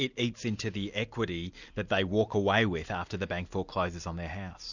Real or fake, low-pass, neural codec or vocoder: real; 7.2 kHz; none